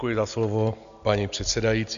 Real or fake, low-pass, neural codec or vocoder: real; 7.2 kHz; none